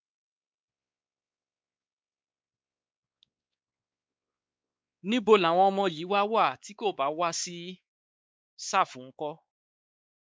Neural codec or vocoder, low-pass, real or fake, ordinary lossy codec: codec, 16 kHz, 4 kbps, X-Codec, WavLM features, trained on Multilingual LibriSpeech; none; fake; none